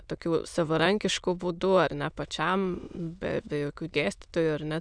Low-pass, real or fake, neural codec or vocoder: 9.9 kHz; fake; autoencoder, 22.05 kHz, a latent of 192 numbers a frame, VITS, trained on many speakers